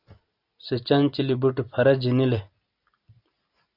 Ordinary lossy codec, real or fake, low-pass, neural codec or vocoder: AAC, 48 kbps; real; 5.4 kHz; none